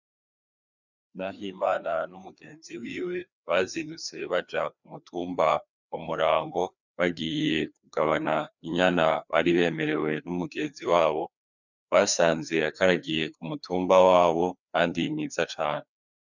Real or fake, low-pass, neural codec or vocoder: fake; 7.2 kHz; codec, 16 kHz, 2 kbps, FreqCodec, larger model